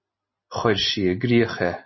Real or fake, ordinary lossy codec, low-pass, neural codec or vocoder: real; MP3, 24 kbps; 7.2 kHz; none